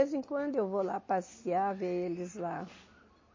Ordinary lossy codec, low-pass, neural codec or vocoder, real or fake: MP3, 32 kbps; 7.2 kHz; none; real